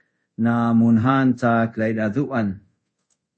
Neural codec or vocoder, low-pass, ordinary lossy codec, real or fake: codec, 24 kHz, 0.5 kbps, DualCodec; 9.9 kHz; MP3, 32 kbps; fake